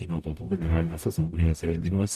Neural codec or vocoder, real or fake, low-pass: codec, 44.1 kHz, 0.9 kbps, DAC; fake; 14.4 kHz